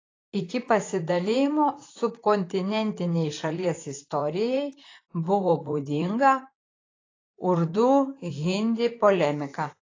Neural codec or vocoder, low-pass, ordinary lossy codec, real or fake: vocoder, 44.1 kHz, 128 mel bands, Pupu-Vocoder; 7.2 kHz; AAC, 32 kbps; fake